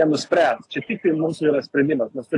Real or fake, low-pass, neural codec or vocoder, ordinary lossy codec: real; 10.8 kHz; none; AAC, 48 kbps